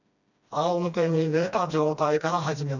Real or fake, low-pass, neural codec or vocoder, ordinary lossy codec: fake; 7.2 kHz; codec, 16 kHz, 1 kbps, FreqCodec, smaller model; none